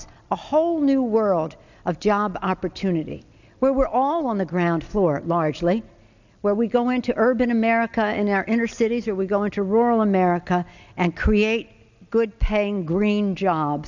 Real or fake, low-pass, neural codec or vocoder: real; 7.2 kHz; none